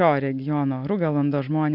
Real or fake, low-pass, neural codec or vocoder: real; 5.4 kHz; none